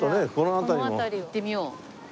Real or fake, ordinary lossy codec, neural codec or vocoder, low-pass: real; none; none; none